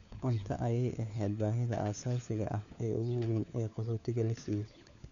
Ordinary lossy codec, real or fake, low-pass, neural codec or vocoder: none; fake; 7.2 kHz; codec, 16 kHz, 4 kbps, FunCodec, trained on LibriTTS, 50 frames a second